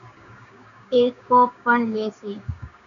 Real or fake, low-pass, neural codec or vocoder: fake; 7.2 kHz; codec, 16 kHz, 6 kbps, DAC